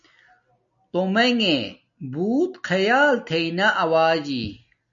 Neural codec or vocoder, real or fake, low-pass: none; real; 7.2 kHz